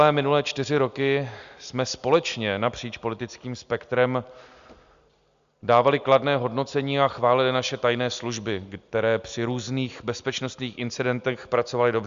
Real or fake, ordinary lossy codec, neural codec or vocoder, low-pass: real; Opus, 64 kbps; none; 7.2 kHz